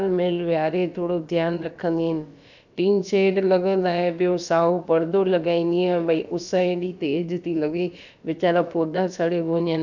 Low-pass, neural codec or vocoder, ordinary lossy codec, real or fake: 7.2 kHz; codec, 16 kHz, about 1 kbps, DyCAST, with the encoder's durations; none; fake